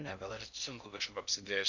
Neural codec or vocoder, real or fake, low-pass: codec, 16 kHz in and 24 kHz out, 0.6 kbps, FocalCodec, streaming, 2048 codes; fake; 7.2 kHz